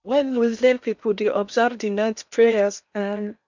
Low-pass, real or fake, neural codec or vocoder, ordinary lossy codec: 7.2 kHz; fake; codec, 16 kHz in and 24 kHz out, 0.8 kbps, FocalCodec, streaming, 65536 codes; none